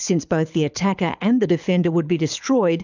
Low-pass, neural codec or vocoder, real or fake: 7.2 kHz; codec, 24 kHz, 6 kbps, HILCodec; fake